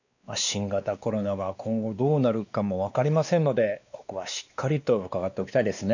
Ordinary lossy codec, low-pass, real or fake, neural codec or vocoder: none; 7.2 kHz; fake; codec, 16 kHz, 2 kbps, X-Codec, WavLM features, trained on Multilingual LibriSpeech